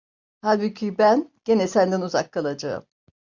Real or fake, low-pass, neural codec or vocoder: real; 7.2 kHz; none